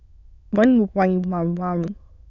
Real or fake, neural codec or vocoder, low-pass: fake; autoencoder, 22.05 kHz, a latent of 192 numbers a frame, VITS, trained on many speakers; 7.2 kHz